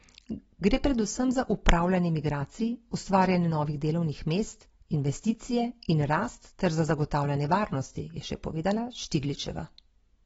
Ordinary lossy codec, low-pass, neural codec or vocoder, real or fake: AAC, 24 kbps; 10.8 kHz; none; real